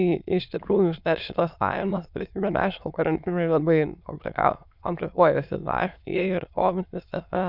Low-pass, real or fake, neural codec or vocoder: 5.4 kHz; fake; autoencoder, 22.05 kHz, a latent of 192 numbers a frame, VITS, trained on many speakers